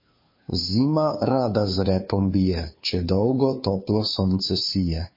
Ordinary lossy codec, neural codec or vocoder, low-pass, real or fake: MP3, 24 kbps; codec, 16 kHz, 2 kbps, FunCodec, trained on Chinese and English, 25 frames a second; 5.4 kHz; fake